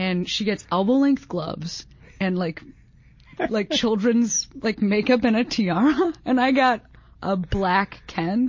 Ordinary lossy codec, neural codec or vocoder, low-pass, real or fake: MP3, 32 kbps; none; 7.2 kHz; real